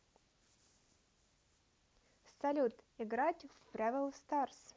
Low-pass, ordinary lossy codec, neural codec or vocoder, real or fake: none; none; none; real